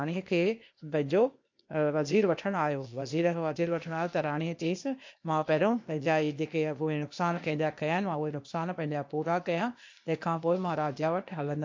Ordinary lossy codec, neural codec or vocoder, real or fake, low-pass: MP3, 48 kbps; codec, 16 kHz, 0.8 kbps, ZipCodec; fake; 7.2 kHz